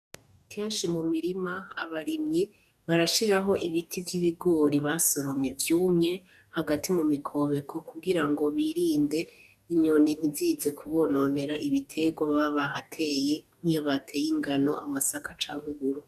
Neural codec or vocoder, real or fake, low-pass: codec, 44.1 kHz, 2.6 kbps, DAC; fake; 14.4 kHz